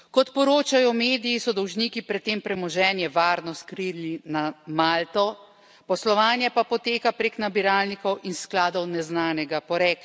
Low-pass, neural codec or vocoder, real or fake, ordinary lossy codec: none; none; real; none